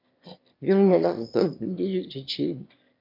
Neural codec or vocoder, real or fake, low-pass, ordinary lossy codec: autoencoder, 22.05 kHz, a latent of 192 numbers a frame, VITS, trained on one speaker; fake; 5.4 kHz; MP3, 48 kbps